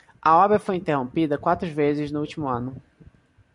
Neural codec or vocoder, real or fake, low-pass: none; real; 10.8 kHz